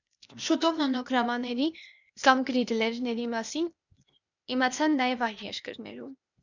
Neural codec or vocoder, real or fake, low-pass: codec, 16 kHz, 0.8 kbps, ZipCodec; fake; 7.2 kHz